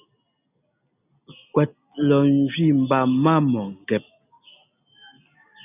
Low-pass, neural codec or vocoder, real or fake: 3.6 kHz; none; real